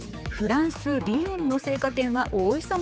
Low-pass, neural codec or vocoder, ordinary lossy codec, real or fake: none; codec, 16 kHz, 4 kbps, X-Codec, HuBERT features, trained on general audio; none; fake